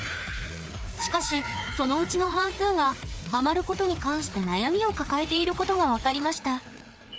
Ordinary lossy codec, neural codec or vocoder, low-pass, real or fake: none; codec, 16 kHz, 4 kbps, FreqCodec, larger model; none; fake